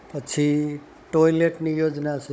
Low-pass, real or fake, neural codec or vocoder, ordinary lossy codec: none; fake; codec, 16 kHz, 16 kbps, FunCodec, trained on Chinese and English, 50 frames a second; none